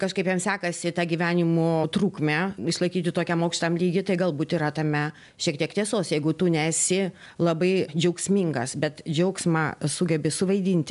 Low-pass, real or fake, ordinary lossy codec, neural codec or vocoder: 10.8 kHz; real; MP3, 96 kbps; none